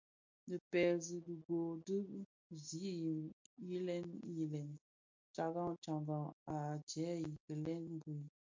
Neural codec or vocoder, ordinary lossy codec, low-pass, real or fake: none; MP3, 48 kbps; 7.2 kHz; real